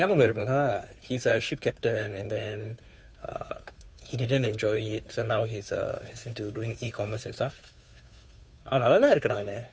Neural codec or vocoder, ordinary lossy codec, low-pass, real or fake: codec, 16 kHz, 2 kbps, FunCodec, trained on Chinese and English, 25 frames a second; none; none; fake